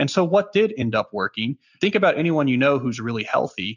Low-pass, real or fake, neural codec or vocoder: 7.2 kHz; real; none